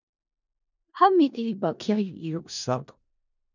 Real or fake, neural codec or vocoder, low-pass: fake; codec, 16 kHz in and 24 kHz out, 0.4 kbps, LongCat-Audio-Codec, four codebook decoder; 7.2 kHz